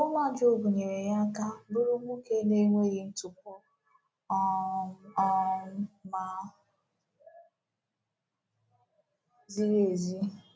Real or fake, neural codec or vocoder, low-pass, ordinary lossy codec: real; none; none; none